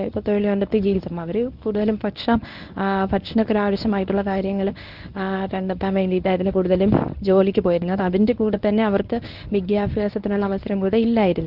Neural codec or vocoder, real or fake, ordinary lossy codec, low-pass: codec, 24 kHz, 0.9 kbps, WavTokenizer, medium speech release version 1; fake; Opus, 32 kbps; 5.4 kHz